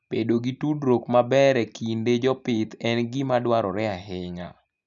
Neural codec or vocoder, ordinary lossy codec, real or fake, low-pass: none; none; real; 7.2 kHz